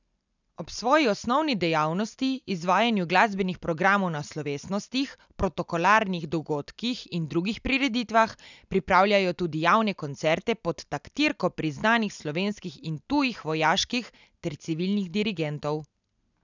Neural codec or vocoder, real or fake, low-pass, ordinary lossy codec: none; real; 7.2 kHz; none